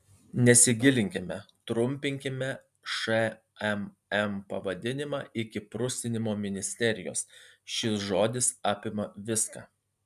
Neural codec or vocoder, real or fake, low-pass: none; real; 14.4 kHz